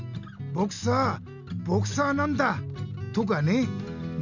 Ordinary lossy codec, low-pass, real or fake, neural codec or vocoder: none; 7.2 kHz; real; none